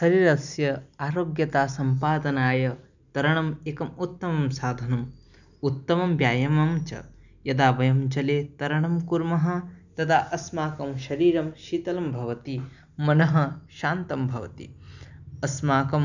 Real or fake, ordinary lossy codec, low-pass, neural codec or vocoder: real; none; 7.2 kHz; none